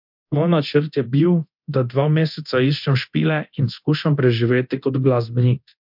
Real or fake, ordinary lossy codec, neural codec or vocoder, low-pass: fake; MP3, 48 kbps; codec, 24 kHz, 0.9 kbps, DualCodec; 5.4 kHz